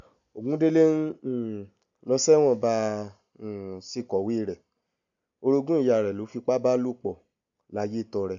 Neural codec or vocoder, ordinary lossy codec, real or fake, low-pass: none; none; real; 7.2 kHz